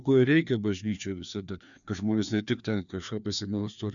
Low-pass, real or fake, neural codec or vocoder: 7.2 kHz; fake; codec, 16 kHz, 2 kbps, FreqCodec, larger model